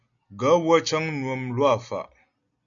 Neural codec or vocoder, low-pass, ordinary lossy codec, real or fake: none; 7.2 kHz; MP3, 96 kbps; real